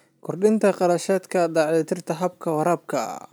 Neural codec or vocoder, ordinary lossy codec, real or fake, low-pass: none; none; real; none